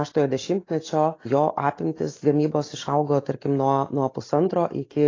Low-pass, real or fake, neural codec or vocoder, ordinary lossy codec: 7.2 kHz; real; none; AAC, 32 kbps